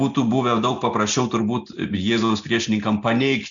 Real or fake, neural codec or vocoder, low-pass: real; none; 7.2 kHz